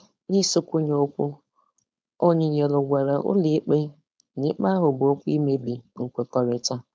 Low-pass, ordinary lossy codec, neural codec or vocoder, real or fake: none; none; codec, 16 kHz, 4.8 kbps, FACodec; fake